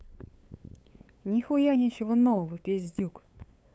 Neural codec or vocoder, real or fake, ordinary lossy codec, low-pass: codec, 16 kHz, 8 kbps, FunCodec, trained on LibriTTS, 25 frames a second; fake; none; none